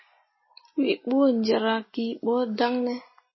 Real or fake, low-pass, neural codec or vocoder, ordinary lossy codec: real; 7.2 kHz; none; MP3, 24 kbps